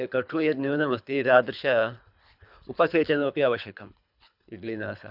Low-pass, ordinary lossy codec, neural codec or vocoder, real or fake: 5.4 kHz; none; codec, 24 kHz, 3 kbps, HILCodec; fake